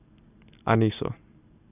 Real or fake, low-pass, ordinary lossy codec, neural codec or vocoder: real; 3.6 kHz; none; none